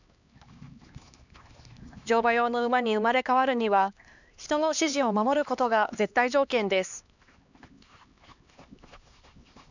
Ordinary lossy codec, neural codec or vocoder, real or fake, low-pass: none; codec, 16 kHz, 2 kbps, X-Codec, HuBERT features, trained on LibriSpeech; fake; 7.2 kHz